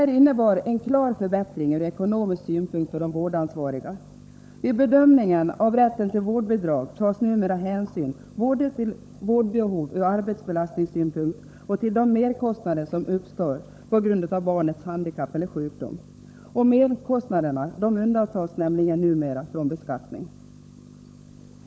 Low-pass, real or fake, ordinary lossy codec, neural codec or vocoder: none; fake; none; codec, 16 kHz, 16 kbps, FunCodec, trained on LibriTTS, 50 frames a second